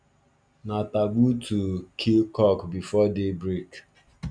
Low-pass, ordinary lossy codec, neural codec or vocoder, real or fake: 9.9 kHz; none; none; real